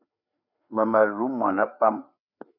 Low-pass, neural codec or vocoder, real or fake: 5.4 kHz; codec, 16 kHz, 4 kbps, FreqCodec, larger model; fake